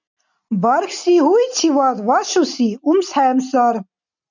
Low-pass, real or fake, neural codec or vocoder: 7.2 kHz; real; none